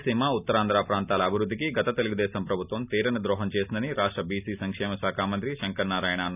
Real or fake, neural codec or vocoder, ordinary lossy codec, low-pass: real; none; none; 3.6 kHz